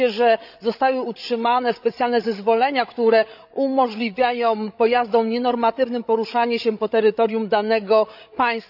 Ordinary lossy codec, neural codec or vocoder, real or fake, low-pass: none; codec, 16 kHz, 16 kbps, FreqCodec, larger model; fake; 5.4 kHz